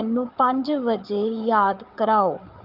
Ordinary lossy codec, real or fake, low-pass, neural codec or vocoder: Opus, 32 kbps; fake; 5.4 kHz; codec, 16 kHz, 8 kbps, FreqCodec, larger model